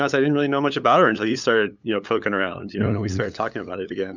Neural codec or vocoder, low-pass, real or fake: codec, 16 kHz, 8 kbps, FunCodec, trained on LibriTTS, 25 frames a second; 7.2 kHz; fake